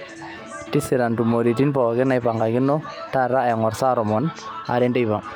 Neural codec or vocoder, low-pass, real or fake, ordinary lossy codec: none; 19.8 kHz; real; none